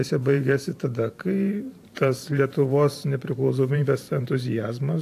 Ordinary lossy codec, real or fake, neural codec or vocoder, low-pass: AAC, 64 kbps; fake; vocoder, 44.1 kHz, 128 mel bands every 512 samples, BigVGAN v2; 14.4 kHz